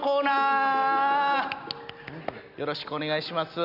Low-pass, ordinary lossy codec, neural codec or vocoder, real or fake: 5.4 kHz; none; none; real